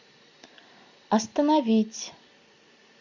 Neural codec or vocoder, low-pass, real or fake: none; 7.2 kHz; real